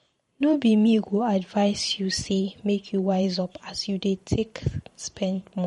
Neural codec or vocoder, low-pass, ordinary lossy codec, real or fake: vocoder, 44.1 kHz, 128 mel bands every 512 samples, BigVGAN v2; 19.8 kHz; MP3, 48 kbps; fake